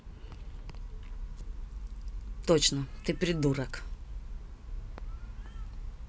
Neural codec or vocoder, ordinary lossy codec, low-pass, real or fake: none; none; none; real